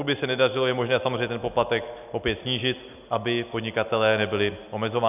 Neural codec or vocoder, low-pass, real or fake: none; 3.6 kHz; real